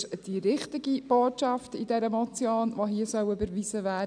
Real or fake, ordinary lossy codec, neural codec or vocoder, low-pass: real; none; none; 9.9 kHz